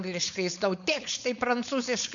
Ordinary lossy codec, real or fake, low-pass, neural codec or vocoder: MP3, 96 kbps; fake; 7.2 kHz; codec, 16 kHz, 4.8 kbps, FACodec